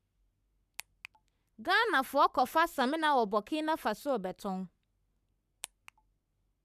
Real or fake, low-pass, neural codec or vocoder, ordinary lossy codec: fake; 14.4 kHz; codec, 44.1 kHz, 7.8 kbps, Pupu-Codec; none